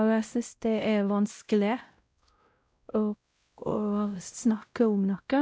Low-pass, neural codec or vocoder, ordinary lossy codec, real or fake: none; codec, 16 kHz, 0.5 kbps, X-Codec, WavLM features, trained on Multilingual LibriSpeech; none; fake